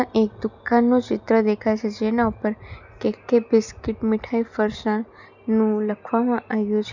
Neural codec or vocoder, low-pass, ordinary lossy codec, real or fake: none; 7.2 kHz; none; real